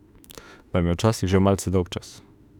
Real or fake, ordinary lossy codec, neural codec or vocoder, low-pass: fake; none; autoencoder, 48 kHz, 32 numbers a frame, DAC-VAE, trained on Japanese speech; 19.8 kHz